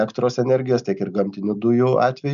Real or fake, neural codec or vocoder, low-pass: real; none; 7.2 kHz